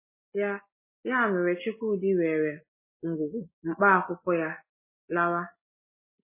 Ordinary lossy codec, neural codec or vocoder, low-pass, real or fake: MP3, 16 kbps; none; 3.6 kHz; real